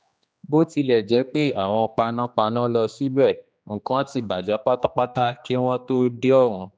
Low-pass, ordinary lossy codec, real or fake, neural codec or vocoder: none; none; fake; codec, 16 kHz, 2 kbps, X-Codec, HuBERT features, trained on general audio